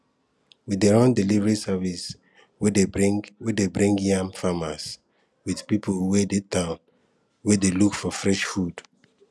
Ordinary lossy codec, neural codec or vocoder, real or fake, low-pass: none; none; real; none